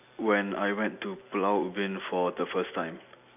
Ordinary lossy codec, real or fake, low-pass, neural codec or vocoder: none; real; 3.6 kHz; none